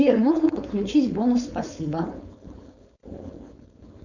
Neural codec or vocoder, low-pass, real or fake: codec, 16 kHz, 4.8 kbps, FACodec; 7.2 kHz; fake